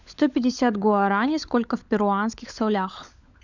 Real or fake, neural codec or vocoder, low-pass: fake; codec, 16 kHz, 16 kbps, FunCodec, trained on LibriTTS, 50 frames a second; 7.2 kHz